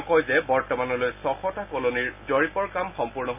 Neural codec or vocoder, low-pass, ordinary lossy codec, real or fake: none; 3.6 kHz; none; real